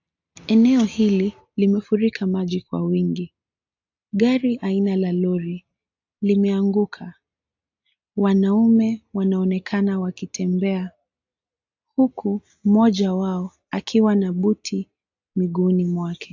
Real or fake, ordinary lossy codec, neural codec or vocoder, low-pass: real; AAC, 48 kbps; none; 7.2 kHz